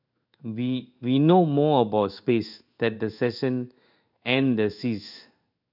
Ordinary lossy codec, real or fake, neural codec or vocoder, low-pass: none; fake; codec, 16 kHz in and 24 kHz out, 1 kbps, XY-Tokenizer; 5.4 kHz